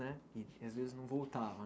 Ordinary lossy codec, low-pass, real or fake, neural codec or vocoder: none; none; real; none